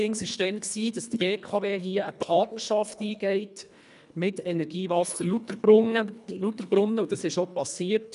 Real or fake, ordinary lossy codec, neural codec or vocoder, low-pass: fake; none; codec, 24 kHz, 1.5 kbps, HILCodec; 10.8 kHz